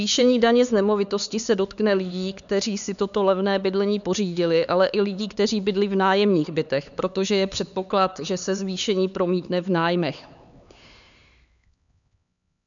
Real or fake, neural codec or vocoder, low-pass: fake; codec, 16 kHz, 4 kbps, X-Codec, HuBERT features, trained on LibriSpeech; 7.2 kHz